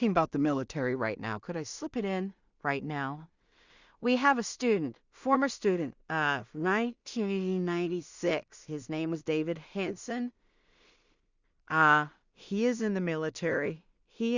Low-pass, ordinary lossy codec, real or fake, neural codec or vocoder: 7.2 kHz; Opus, 64 kbps; fake; codec, 16 kHz in and 24 kHz out, 0.4 kbps, LongCat-Audio-Codec, two codebook decoder